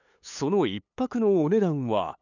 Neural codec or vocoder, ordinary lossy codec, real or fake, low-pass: codec, 16 kHz, 8 kbps, FunCodec, trained on LibriTTS, 25 frames a second; none; fake; 7.2 kHz